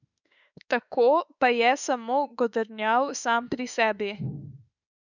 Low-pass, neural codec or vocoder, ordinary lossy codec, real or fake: 7.2 kHz; autoencoder, 48 kHz, 32 numbers a frame, DAC-VAE, trained on Japanese speech; none; fake